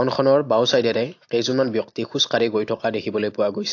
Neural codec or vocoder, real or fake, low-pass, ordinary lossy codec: none; real; 7.2 kHz; AAC, 48 kbps